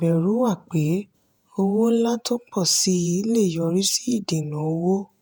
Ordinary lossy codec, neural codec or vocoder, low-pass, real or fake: none; vocoder, 48 kHz, 128 mel bands, Vocos; none; fake